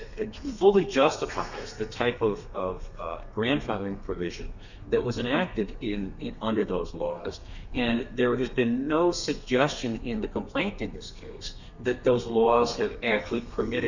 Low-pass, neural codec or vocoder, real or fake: 7.2 kHz; codec, 32 kHz, 1.9 kbps, SNAC; fake